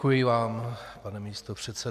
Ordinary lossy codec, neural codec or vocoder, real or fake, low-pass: AAC, 96 kbps; none; real; 14.4 kHz